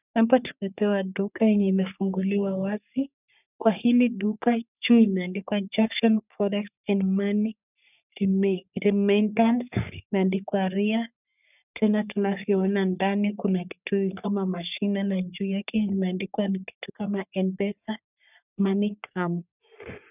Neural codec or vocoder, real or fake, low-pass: codec, 44.1 kHz, 3.4 kbps, Pupu-Codec; fake; 3.6 kHz